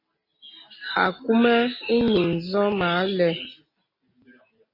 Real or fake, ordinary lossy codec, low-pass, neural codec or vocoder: real; MP3, 24 kbps; 5.4 kHz; none